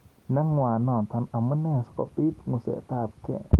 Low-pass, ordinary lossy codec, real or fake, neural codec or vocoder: 19.8 kHz; Opus, 24 kbps; real; none